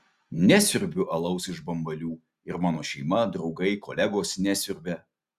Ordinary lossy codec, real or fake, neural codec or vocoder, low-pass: Opus, 64 kbps; real; none; 14.4 kHz